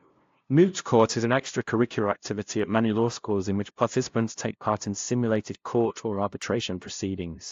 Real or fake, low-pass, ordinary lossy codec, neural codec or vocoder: fake; 7.2 kHz; AAC, 48 kbps; codec, 16 kHz, 0.5 kbps, FunCodec, trained on LibriTTS, 25 frames a second